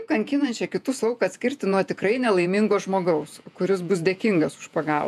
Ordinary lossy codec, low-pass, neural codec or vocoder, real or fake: MP3, 96 kbps; 14.4 kHz; vocoder, 48 kHz, 128 mel bands, Vocos; fake